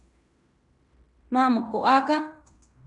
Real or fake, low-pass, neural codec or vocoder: fake; 10.8 kHz; codec, 16 kHz in and 24 kHz out, 0.9 kbps, LongCat-Audio-Codec, fine tuned four codebook decoder